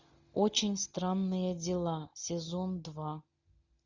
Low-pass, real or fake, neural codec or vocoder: 7.2 kHz; real; none